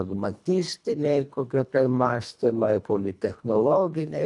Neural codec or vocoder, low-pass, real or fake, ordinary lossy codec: codec, 24 kHz, 1.5 kbps, HILCodec; 10.8 kHz; fake; MP3, 96 kbps